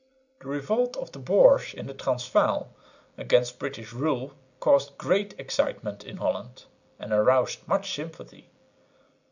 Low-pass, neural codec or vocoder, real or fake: 7.2 kHz; none; real